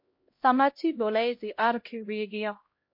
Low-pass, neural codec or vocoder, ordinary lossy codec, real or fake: 5.4 kHz; codec, 16 kHz, 0.5 kbps, X-Codec, HuBERT features, trained on LibriSpeech; MP3, 32 kbps; fake